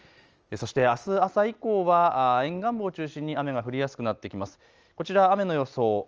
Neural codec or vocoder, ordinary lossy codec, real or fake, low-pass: none; Opus, 24 kbps; real; 7.2 kHz